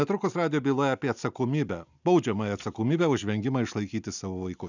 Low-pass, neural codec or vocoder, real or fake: 7.2 kHz; none; real